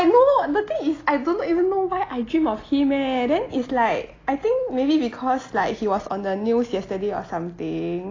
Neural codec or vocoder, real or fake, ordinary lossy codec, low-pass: none; real; AAC, 32 kbps; 7.2 kHz